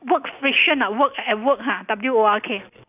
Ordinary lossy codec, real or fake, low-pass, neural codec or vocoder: none; real; 3.6 kHz; none